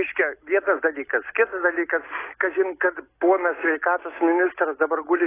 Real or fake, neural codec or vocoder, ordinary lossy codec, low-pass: real; none; AAC, 16 kbps; 3.6 kHz